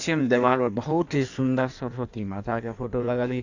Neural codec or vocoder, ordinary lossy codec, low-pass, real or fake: codec, 16 kHz in and 24 kHz out, 1.1 kbps, FireRedTTS-2 codec; none; 7.2 kHz; fake